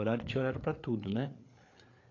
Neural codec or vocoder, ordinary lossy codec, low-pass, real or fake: codec, 16 kHz, 8 kbps, FreqCodec, larger model; AAC, 32 kbps; 7.2 kHz; fake